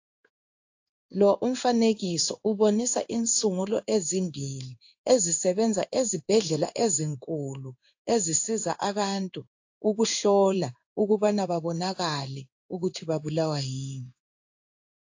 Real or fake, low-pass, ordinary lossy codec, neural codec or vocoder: fake; 7.2 kHz; AAC, 48 kbps; codec, 16 kHz in and 24 kHz out, 1 kbps, XY-Tokenizer